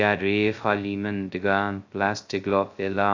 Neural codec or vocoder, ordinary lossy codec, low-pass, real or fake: codec, 16 kHz, 0.2 kbps, FocalCodec; AAC, 48 kbps; 7.2 kHz; fake